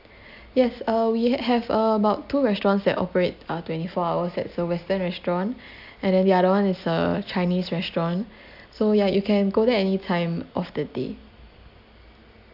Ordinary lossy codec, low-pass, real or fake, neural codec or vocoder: AAC, 48 kbps; 5.4 kHz; real; none